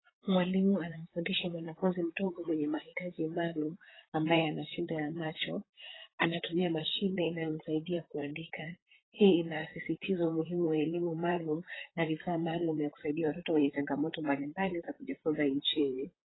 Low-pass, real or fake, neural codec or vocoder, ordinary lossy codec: 7.2 kHz; fake; vocoder, 44.1 kHz, 128 mel bands, Pupu-Vocoder; AAC, 16 kbps